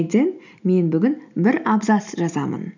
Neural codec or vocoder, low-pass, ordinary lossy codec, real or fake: none; 7.2 kHz; none; real